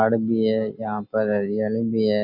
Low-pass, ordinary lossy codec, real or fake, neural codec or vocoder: 5.4 kHz; none; real; none